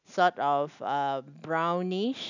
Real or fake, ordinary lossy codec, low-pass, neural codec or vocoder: real; none; 7.2 kHz; none